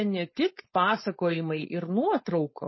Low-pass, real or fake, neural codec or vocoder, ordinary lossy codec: 7.2 kHz; fake; codec, 16 kHz, 4.8 kbps, FACodec; MP3, 24 kbps